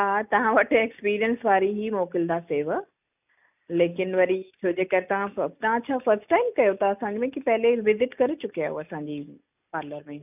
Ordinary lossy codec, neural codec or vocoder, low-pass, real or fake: none; none; 3.6 kHz; real